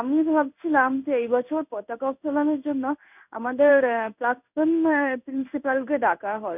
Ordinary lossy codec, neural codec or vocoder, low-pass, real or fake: none; codec, 16 kHz in and 24 kHz out, 1 kbps, XY-Tokenizer; 3.6 kHz; fake